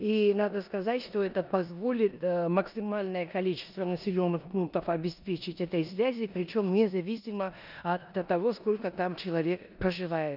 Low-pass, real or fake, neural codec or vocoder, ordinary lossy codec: 5.4 kHz; fake; codec, 16 kHz in and 24 kHz out, 0.9 kbps, LongCat-Audio-Codec, four codebook decoder; none